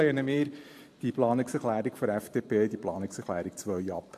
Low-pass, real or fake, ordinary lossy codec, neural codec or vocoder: 14.4 kHz; fake; AAC, 64 kbps; vocoder, 44.1 kHz, 128 mel bands every 512 samples, BigVGAN v2